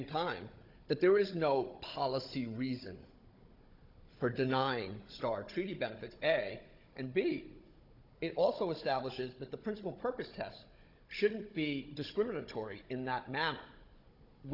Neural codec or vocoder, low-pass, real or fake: codec, 16 kHz, 16 kbps, FunCodec, trained on Chinese and English, 50 frames a second; 5.4 kHz; fake